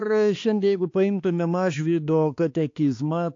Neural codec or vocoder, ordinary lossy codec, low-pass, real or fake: codec, 16 kHz, 2 kbps, X-Codec, HuBERT features, trained on balanced general audio; AAC, 48 kbps; 7.2 kHz; fake